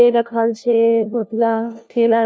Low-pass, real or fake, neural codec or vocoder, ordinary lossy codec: none; fake; codec, 16 kHz, 1 kbps, FunCodec, trained on LibriTTS, 50 frames a second; none